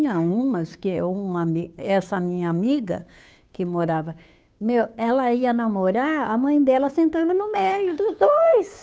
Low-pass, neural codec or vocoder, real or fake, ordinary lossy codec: none; codec, 16 kHz, 2 kbps, FunCodec, trained on Chinese and English, 25 frames a second; fake; none